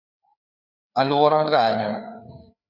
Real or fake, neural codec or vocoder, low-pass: fake; codec, 16 kHz, 4 kbps, FreqCodec, larger model; 5.4 kHz